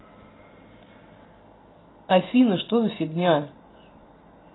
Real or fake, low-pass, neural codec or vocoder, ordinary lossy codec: real; 7.2 kHz; none; AAC, 16 kbps